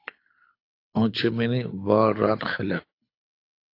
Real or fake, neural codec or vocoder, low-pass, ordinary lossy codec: fake; codec, 24 kHz, 6 kbps, HILCodec; 5.4 kHz; AAC, 32 kbps